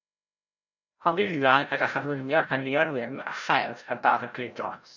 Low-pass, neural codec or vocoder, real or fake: 7.2 kHz; codec, 16 kHz, 0.5 kbps, FreqCodec, larger model; fake